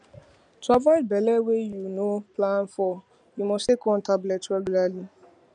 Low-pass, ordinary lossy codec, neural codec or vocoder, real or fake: 9.9 kHz; none; none; real